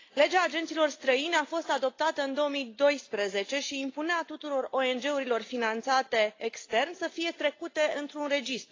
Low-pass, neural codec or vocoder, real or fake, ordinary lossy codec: 7.2 kHz; none; real; AAC, 32 kbps